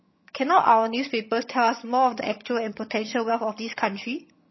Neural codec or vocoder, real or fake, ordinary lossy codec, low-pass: vocoder, 22.05 kHz, 80 mel bands, HiFi-GAN; fake; MP3, 24 kbps; 7.2 kHz